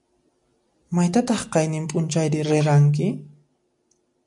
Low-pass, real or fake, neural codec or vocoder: 10.8 kHz; real; none